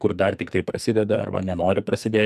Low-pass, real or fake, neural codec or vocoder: 14.4 kHz; fake; codec, 44.1 kHz, 2.6 kbps, SNAC